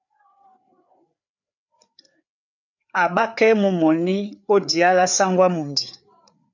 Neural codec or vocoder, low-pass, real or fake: codec, 16 kHz, 4 kbps, FreqCodec, larger model; 7.2 kHz; fake